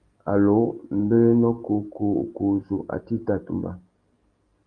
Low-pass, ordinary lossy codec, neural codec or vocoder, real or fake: 9.9 kHz; Opus, 32 kbps; none; real